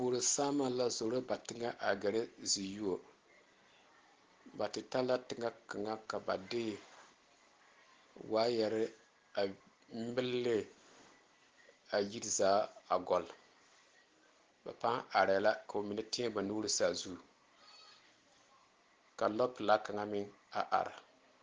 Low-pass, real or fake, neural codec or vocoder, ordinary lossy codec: 7.2 kHz; real; none; Opus, 16 kbps